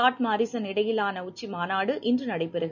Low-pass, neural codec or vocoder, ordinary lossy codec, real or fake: 7.2 kHz; none; none; real